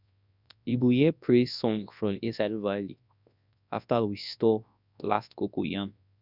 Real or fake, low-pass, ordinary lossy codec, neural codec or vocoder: fake; 5.4 kHz; none; codec, 24 kHz, 0.9 kbps, WavTokenizer, large speech release